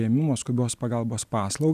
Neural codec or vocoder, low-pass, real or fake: none; 14.4 kHz; real